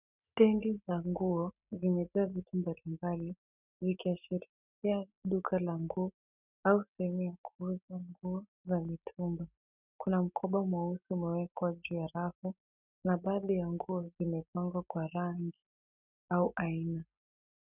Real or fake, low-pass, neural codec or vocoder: real; 3.6 kHz; none